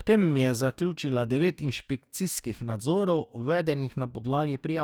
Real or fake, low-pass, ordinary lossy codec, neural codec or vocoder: fake; none; none; codec, 44.1 kHz, 2.6 kbps, DAC